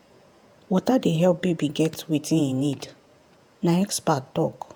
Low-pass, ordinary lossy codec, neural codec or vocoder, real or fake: none; none; vocoder, 48 kHz, 128 mel bands, Vocos; fake